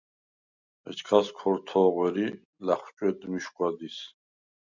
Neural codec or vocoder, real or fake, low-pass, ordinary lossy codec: none; real; 7.2 kHz; Opus, 64 kbps